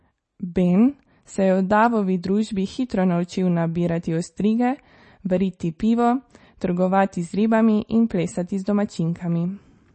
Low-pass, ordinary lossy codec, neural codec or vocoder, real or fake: 10.8 kHz; MP3, 32 kbps; none; real